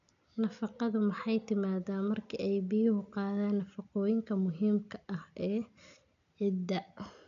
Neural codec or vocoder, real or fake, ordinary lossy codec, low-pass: none; real; none; 7.2 kHz